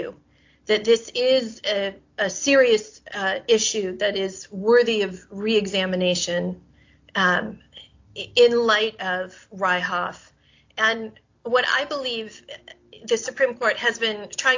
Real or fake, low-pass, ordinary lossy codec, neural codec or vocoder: real; 7.2 kHz; AAC, 48 kbps; none